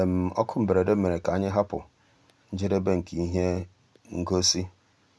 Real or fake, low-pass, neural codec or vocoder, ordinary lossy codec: real; none; none; none